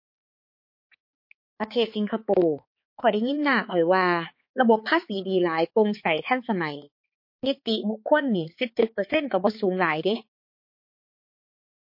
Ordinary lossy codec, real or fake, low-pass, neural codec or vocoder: MP3, 32 kbps; fake; 5.4 kHz; codec, 16 kHz, 4 kbps, X-Codec, HuBERT features, trained on balanced general audio